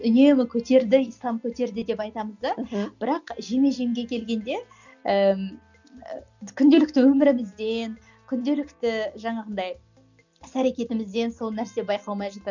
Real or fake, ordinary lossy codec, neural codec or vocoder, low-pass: real; none; none; 7.2 kHz